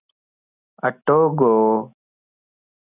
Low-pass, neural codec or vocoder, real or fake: 3.6 kHz; none; real